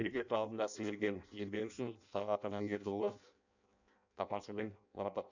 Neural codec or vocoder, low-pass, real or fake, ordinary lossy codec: codec, 16 kHz in and 24 kHz out, 0.6 kbps, FireRedTTS-2 codec; 7.2 kHz; fake; none